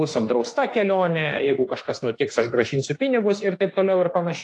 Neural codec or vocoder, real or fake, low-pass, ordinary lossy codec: autoencoder, 48 kHz, 32 numbers a frame, DAC-VAE, trained on Japanese speech; fake; 10.8 kHz; AAC, 48 kbps